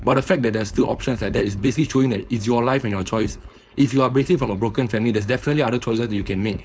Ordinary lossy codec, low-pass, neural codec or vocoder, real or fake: none; none; codec, 16 kHz, 4.8 kbps, FACodec; fake